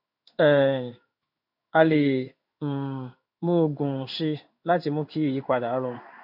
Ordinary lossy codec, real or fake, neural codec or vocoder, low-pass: none; fake; codec, 16 kHz in and 24 kHz out, 1 kbps, XY-Tokenizer; 5.4 kHz